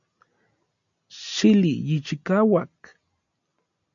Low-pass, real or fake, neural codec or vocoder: 7.2 kHz; real; none